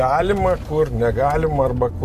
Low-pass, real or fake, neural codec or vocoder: 14.4 kHz; real; none